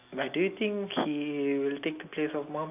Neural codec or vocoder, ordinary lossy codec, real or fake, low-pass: none; none; real; 3.6 kHz